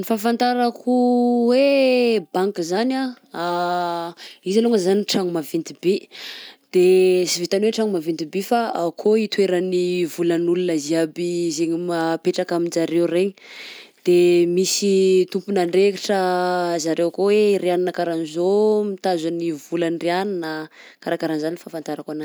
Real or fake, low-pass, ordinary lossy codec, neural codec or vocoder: real; none; none; none